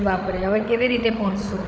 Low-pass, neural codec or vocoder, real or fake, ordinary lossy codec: none; codec, 16 kHz, 16 kbps, FreqCodec, larger model; fake; none